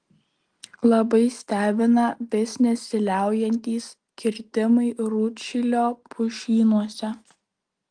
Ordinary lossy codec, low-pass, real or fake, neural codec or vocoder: Opus, 24 kbps; 9.9 kHz; real; none